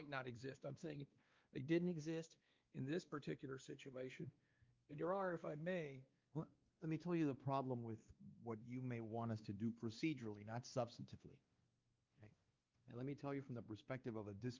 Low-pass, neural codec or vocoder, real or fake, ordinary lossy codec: 7.2 kHz; codec, 16 kHz, 2 kbps, X-Codec, WavLM features, trained on Multilingual LibriSpeech; fake; Opus, 32 kbps